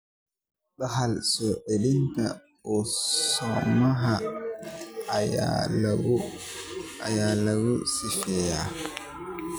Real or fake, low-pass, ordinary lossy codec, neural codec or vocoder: real; none; none; none